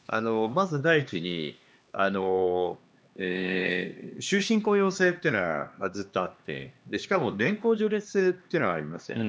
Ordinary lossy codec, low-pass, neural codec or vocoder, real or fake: none; none; codec, 16 kHz, 2 kbps, X-Codec, HuBERT features, trained on LibriSpeech; fake